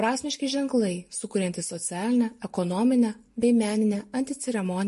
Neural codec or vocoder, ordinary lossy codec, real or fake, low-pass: none; MP3, 48 kbps; real; 14.4 kHz